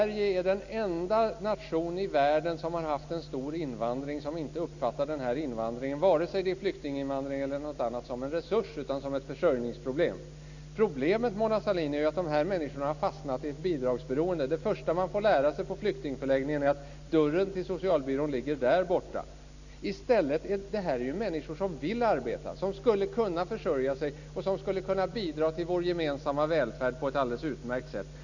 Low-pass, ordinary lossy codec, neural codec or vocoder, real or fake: 7.2 kHz; none; none; real